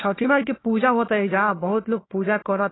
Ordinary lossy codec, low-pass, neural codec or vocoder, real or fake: AAC, 16 kbps; 7.2 kHz; codec, 16 kHz, 1 kbps, FunCodec, trained on Chinese and English, 50 frames a second; fake